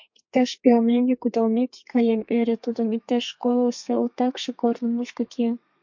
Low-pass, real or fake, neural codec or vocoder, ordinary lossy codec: 7.2 kHz; fake; codec, 32 kHz, 1.9 kbps, SNAC; MP3, 48 kbps